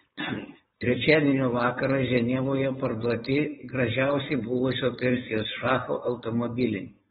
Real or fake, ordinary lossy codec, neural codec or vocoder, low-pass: fake; AAC, 16 kbps; codec, 16 kHz, 4.8 kbps, FACodec; 7.2 kHz